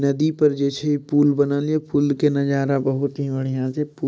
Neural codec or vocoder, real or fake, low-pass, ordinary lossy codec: none; real; none; none